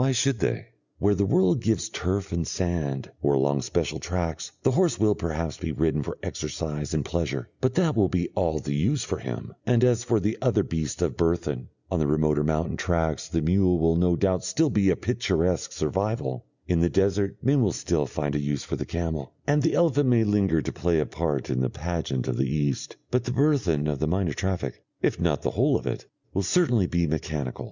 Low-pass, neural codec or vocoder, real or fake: 7.2 kHz; none; real